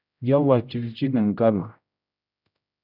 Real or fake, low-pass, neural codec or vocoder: fake; 5.4 kHz; codec, 16 kHz, 0.5 kbps, X-Codec, HuBERT features, trained on general audio